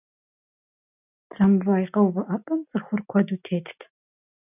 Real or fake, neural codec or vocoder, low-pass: real; none; 3.6 kHz